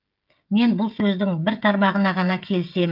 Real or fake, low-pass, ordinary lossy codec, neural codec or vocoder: fake; 5.4 kHz; Opus, 24 kbps; codec, 16 kHz, 16 kbps, FreqCodec, smaller model